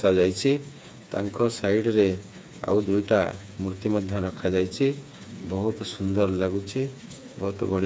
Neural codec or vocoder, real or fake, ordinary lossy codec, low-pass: codec, 16 kHz, 4 kbps, FreqCodec, smaller model; fake; none; none